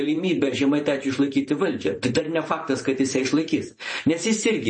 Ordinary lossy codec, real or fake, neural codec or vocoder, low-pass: MP3, 32 kbps; fake; vocoder, 44.1 kHz, 128 mel bands every 512 samples, BigVGAN v2; 10.8 kHz